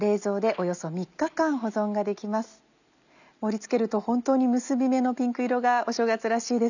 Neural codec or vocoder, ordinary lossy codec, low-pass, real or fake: none; none; 7.2 kHz; real